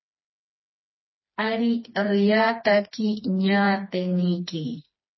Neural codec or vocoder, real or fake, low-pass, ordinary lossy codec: codec, 16 kHz, 2 kbps, FreqCodec, smaller model; fake; 7.2 kHz; MP3, 24 kbps